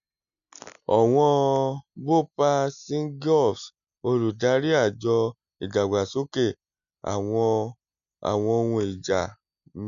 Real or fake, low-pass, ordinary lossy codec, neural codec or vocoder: real; 7.2 kHz; none; none